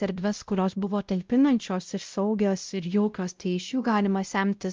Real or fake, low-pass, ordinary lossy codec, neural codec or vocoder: fake; 7.2 kHz; Opus, 24 kbps; codec, 16 kHz, 0.5 kbps, X-Codec, WavLM features, trained on Multilingual LibriSpeech